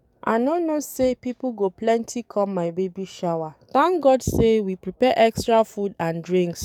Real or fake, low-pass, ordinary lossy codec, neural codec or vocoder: fake; 19.8 kHz; none; codec, 44.1 kHz, 7.8 kbps, DAC